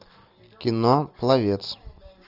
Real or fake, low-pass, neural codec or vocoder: real; 5.4 kHz; none